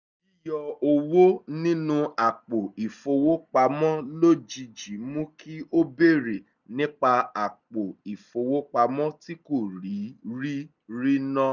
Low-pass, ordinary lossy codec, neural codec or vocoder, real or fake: 7.2 kHz; none; none; real